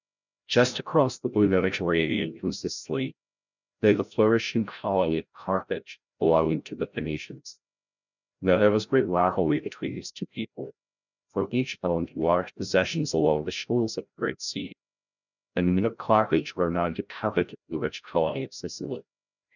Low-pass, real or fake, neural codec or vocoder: 7.2 kHz; fake; codec, 16 kHz, 0.5 kbps, FreqCodec, larger model